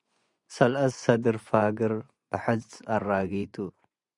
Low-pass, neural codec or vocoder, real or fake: 10.8 kHz; vocoder, 24 kHz, 100 mel bands, Vocos; fake